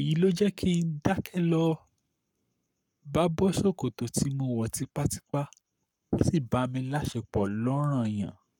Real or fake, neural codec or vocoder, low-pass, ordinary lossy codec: fake; codec, 44.1 kHz, 7.8 kbps, Pupu-Codec; 19.8 kHz; none